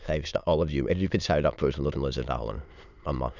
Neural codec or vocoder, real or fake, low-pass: autoencoder, 22.05 kHz, a latent of 192 numbers a frame, VITS, trained on many speakers; fake; 7.2 kHz